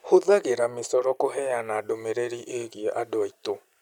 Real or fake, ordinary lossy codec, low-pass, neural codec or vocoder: real; none; 19.8 kHz; none